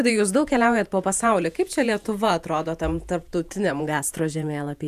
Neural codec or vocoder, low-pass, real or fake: vocoder, 48 kHz, 128 mel bands, Vocos; 14.4 kHz; fake